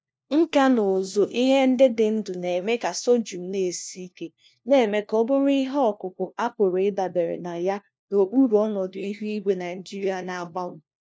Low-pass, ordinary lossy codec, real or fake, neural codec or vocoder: none; none; fake; codec, 16 kHz, 1 kbps, FunCodec, trained on LibriTTS, 50 frames a second